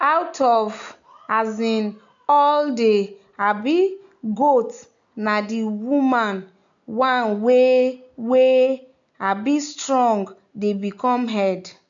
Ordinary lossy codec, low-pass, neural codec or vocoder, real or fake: MP3, 64 kbps; 7.2 kHz; none; real